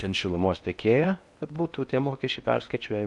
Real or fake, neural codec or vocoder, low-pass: fake; codec, 16 kHz in and 24 kHz out, 0.8 kbps, FocalCodec, streaming, 65536 codes; 10.8 kHz